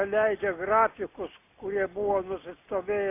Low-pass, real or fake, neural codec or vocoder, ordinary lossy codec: 3.6 kHz; real; none; AAC, 24 kbps